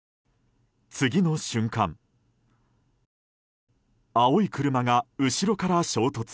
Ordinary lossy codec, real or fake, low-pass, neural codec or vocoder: none; real; none; none